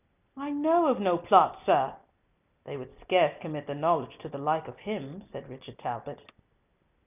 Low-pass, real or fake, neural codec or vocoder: 3.6 kHz; real; none